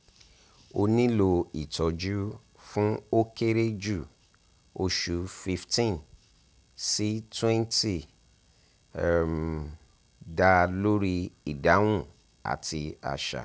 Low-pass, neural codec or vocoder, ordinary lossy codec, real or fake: none; none; none; real